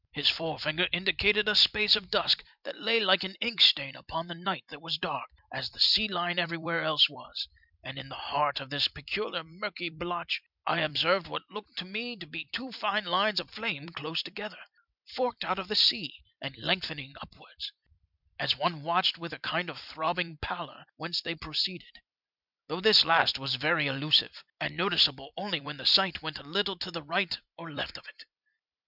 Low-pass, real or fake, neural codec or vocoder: 5.4 kHz; fake; vocoder, 44.1 kHz, 128 mel bands every 256 samples, BigVGAN v2